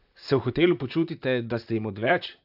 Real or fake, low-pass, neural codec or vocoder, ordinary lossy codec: fake; 5.4 kHz; vocoder, 44.1 kHz, 128 mel bands, Pupu-Vocoder; AAC, 48 kbps